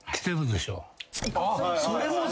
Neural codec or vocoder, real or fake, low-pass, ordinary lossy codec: none; real; none; none